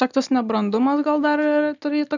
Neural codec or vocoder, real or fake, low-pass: none; real; 7.2 kHz